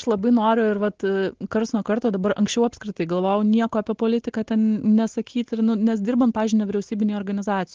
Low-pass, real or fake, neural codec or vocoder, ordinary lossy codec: 7.2 kHz; real; none; Opus, 16 kbps